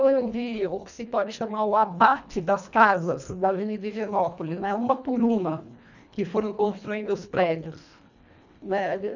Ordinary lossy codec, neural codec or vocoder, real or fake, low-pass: none; codec, 24 kHz, 1.5 kbps, HILCodec; fake; 7.2 kHz